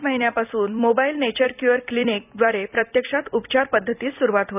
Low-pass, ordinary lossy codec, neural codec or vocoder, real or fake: 3.6 kHz; none; vocoder, 44.1 kHz, 128 mel bands every 256 samples, BigVGAN v2; fake